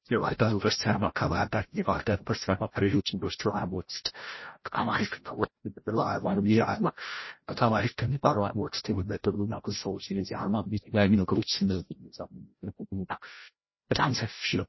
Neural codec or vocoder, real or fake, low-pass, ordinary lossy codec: codec, 16 kHz, 0.5 kbps, FreqCodec, larger model; fake; 7.2 kHz; MP3, 24 kbps